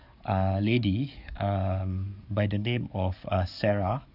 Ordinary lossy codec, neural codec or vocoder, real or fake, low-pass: none; codec, 44.1 kHz, 7.8 kbps, DAC; fake; 5.4 kHz